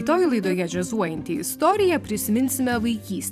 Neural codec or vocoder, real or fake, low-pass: vocoder, 44.1 kHz, 128 mel bands every 512 samples, BigVGAN v2; fake; 14.4 kHz